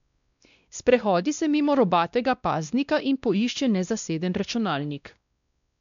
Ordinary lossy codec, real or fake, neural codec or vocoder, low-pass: none; fake; codec, 16 kHz, 1 kbps, X-Codec, WavLM features, trained on Multilingual LibriSpeech; 7.2 kHz